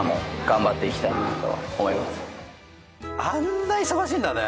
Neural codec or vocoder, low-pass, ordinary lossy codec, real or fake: none; none; none; real